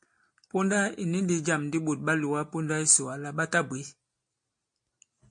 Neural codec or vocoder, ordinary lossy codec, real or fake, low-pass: none; MP3, 48 kbps; real; 9.9 kHz